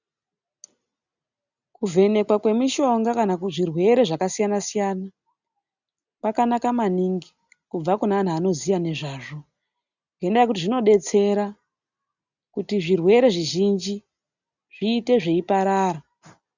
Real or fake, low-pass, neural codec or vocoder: real; 7.2 kHz; none